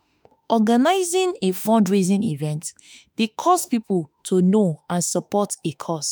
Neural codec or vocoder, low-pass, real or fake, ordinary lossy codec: autoencoder, 48 kHz, 32 numbers a frame, DAC-VAE, trained on Japanese speech; none; fake; none